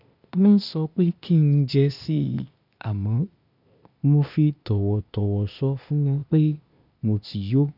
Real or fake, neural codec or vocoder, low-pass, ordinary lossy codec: fake; codec, 16 kHz, 0.8 kbps, ZipCodec; 5.4 kHz; none